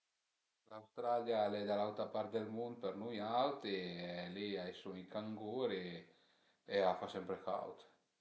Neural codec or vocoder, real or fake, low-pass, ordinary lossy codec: none; real; none; none